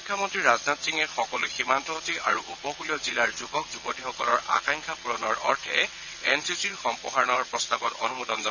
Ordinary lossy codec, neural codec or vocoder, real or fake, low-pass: none; vocoder, 22.05 kHz, 80 mel bands, WaveNeXt; fake; 7.2 kHz